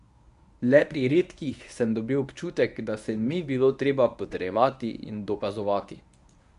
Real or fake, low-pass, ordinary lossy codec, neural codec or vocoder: fake; 10.8 kHz; none; codec, 24 kHz, 0.9 kbps, WavTokenizer, medium speech release version 1